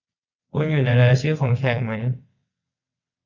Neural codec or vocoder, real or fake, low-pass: vocoder, 22.05 kHz, 80 mel bands, WaveNeXt; fake; 7.2 kHz